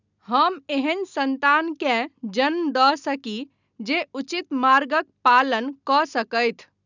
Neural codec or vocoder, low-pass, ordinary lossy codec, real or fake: none; 7.2 kHz; none; real